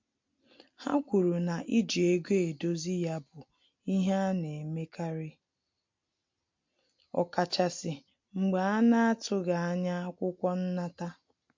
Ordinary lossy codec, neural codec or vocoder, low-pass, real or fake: MP3, 48 kbps; none; 7.2 kHz; real